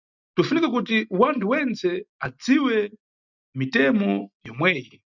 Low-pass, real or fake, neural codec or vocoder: 7.2 kHz; real; none